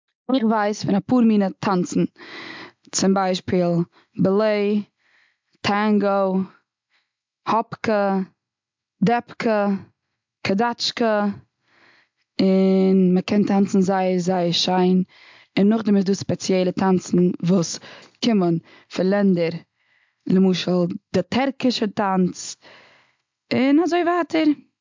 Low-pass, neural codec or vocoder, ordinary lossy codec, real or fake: 7.2 kHz; none; MP3, 64 kbps; real